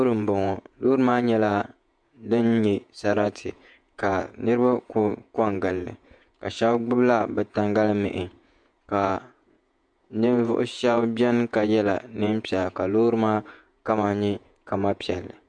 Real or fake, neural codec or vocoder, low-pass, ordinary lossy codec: fake; vocoder, 48 kHz, 128 mel bands, Vocos; 9.9 kHz; MP3, 64 kbps